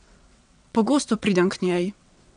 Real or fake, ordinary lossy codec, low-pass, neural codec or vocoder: fake; none; 9.9 kHz; vocoder, 22.05 kHz, 80 mel bands, WaveNeXt